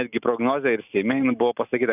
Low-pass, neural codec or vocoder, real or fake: 3.6 kHz; none; real